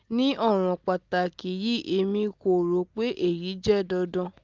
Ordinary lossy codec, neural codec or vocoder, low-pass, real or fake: Opus, 32 kbps; none; 7.2 kHz; real